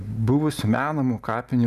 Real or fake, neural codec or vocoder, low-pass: real; none; 14.4 kHz